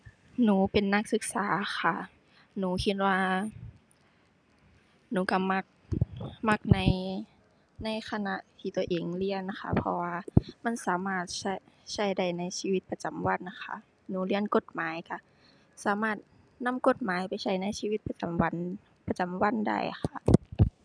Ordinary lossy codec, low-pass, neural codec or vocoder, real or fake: none; 9.9 kHz; none; real